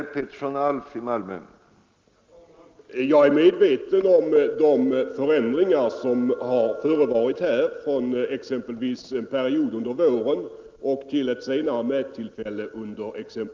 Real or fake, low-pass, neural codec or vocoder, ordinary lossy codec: real; 7.2 kHz; none; Opus, 32 kbps